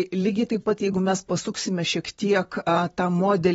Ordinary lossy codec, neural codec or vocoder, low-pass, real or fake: AAC, 24 kbps; vocoder, 44.1 kHz, 128 mel bands, Pupu-Vocoder; 19.8 kHz; fake